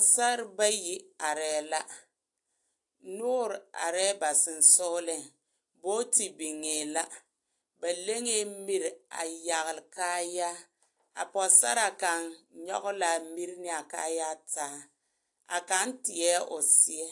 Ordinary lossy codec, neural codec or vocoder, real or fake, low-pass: AAC, 64 kbps; none; real; 10.8 kHz